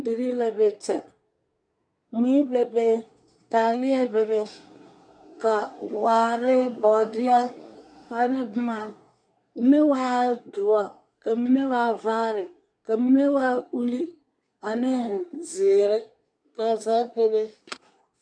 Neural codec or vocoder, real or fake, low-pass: codec, 24 kHz, 1 kbps, SNAC; fake; 9.9 kHz